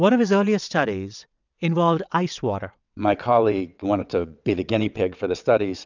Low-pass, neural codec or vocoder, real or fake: 7.2 kHz; vocoder, 22.05 kHz, 80 mel bands, WaveNeXt; fake